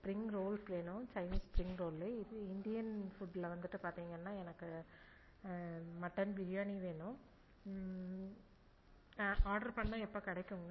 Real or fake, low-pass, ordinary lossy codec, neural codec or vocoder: real; 7.2 kHz; MP3, 24 kbps; none